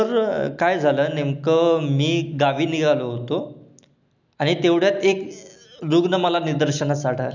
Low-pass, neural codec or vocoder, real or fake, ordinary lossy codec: 7.2 kHz; none; real; none